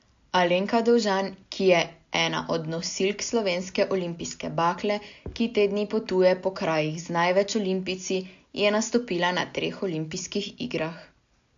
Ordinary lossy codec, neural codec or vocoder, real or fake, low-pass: AAC, 64 kbps; none; real; 7.2 kHz